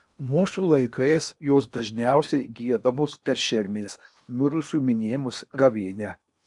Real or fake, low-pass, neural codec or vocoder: fake; 10.8 kHz; codec, 16 kHz in and 24 kHz out, 0.8 kbps, FocalCodec, streaming, 65536 codes